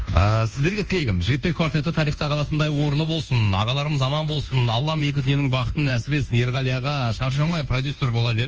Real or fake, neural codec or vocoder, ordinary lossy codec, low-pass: fake; codec, 24 kHz, 1.2 kbps, DualCodec; Opus, 24 kbps; 7.2 kHz